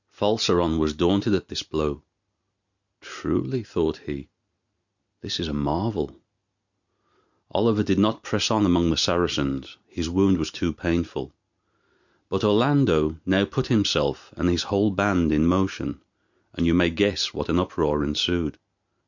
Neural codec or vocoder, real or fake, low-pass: none; real; 7.2 kHz